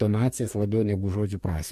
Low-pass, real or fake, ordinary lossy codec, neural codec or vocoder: 14.4 kHz; fake; MP3, 64 kbps; codec, 44.1 kHz, 2.6 kbps, DAC